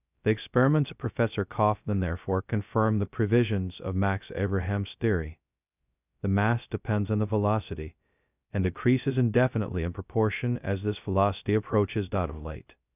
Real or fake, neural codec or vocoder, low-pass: fake; codec, 16 kHz, 0.2 kbps, FocalCodec; 3.6 kHz